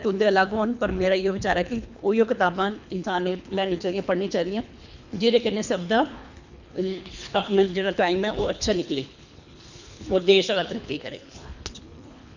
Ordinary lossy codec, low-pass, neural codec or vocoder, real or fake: none; 7.2 kHz; codec, 24 kHz, 3 kbps, HILCodec; fake